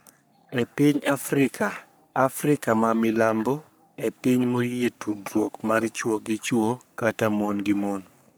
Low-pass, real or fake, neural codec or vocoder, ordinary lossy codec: none; fake; codec, 44.1 kHz, 3.4 kbps, Pupu-Codec; none